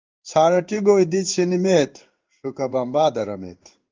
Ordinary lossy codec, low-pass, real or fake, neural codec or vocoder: Opus, 32 kbps; 7.2 kHz; fake; codec, 16 kHz in and 24 kHz out, 1 kbps, XY-Tokenizer